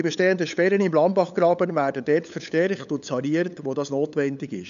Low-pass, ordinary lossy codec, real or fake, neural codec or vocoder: 7.2 kHz; none; fake; codec, 16 kHz, 8 kbps, FunCodec, trained on LibriTTS, 25 frames a second